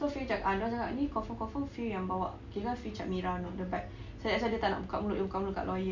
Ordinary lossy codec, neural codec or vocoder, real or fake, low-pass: MP3, 64 kbps; none; real; 7.2 kHz